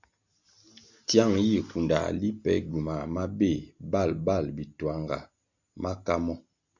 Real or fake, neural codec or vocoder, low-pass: real; none; 7.2 kHz